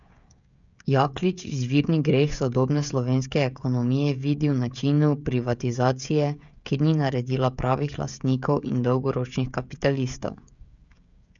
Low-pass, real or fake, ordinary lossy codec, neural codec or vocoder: 7.2 kHz; fake; none; codec, 16 kHz, 16 kbps, FreqCodec, smaller model